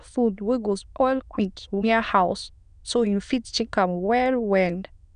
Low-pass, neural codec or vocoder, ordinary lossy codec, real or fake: 9.9 kHz; autoencoder, 22.05 kHz, a latent of 192 numbers a frame, VITS, trained on many speakers; none; fake